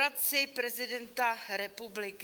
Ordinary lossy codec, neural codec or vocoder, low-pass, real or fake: Opus, 24 kbps; autoencoder, 48 kHz, 128 numbers a frame, DAC-VAE, trained on Japanese speech; 19.8 kHz; fake